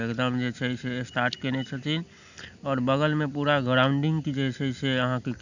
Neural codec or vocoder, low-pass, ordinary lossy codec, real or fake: none; 7.2 kHz; none; real